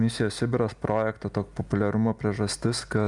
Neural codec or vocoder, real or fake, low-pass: none; real; 10.8 kHz